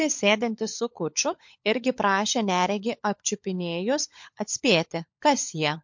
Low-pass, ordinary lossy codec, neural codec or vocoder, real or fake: 7.2 kHz; MP3, 48 kbps; codec, 16 kHz, 16 kbps, FunCodec, trained on LibriTTS, 50 frames a second; fake